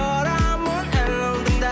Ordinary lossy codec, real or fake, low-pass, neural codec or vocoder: none; real; none; none